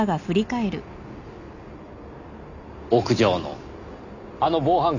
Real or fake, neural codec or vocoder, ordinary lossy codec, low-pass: real; none; none; 7.2 kHz